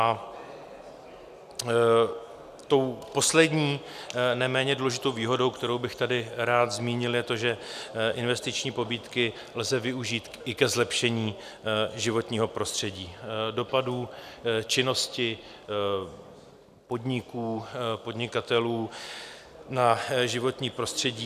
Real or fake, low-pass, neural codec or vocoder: real; 14.4 kHz; none